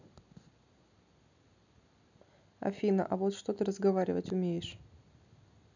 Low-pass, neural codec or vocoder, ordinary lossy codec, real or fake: 7.2 kHz; none; none; real